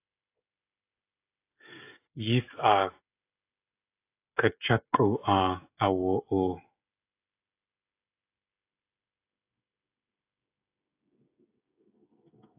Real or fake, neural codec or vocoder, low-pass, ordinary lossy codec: fake; codec, 16 kHz, 16 kbps, FreqCodec, smaller model; 3.6 kHz; AAC, 24 kbps